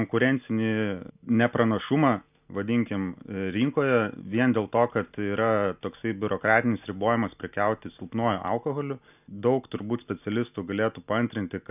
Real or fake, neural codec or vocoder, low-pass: real; none; 3.6 kHz